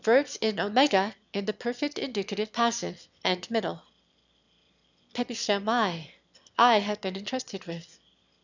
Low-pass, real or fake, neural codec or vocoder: 7.2 kHz; fake; autoencoder, 22.05 kHz, a latent of 192 numbers a frame, VITS, trained on one speaker